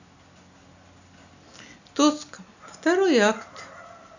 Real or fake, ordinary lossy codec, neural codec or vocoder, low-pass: real; none; none; 7.2 kHz